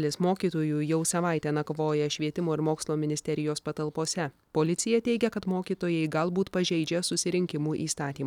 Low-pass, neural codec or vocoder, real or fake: 19.8 kHz; none; real